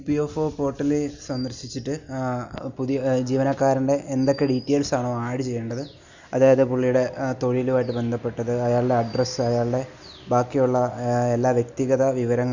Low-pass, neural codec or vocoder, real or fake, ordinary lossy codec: 7.2 kHz; none; real; Opus, 64 kbps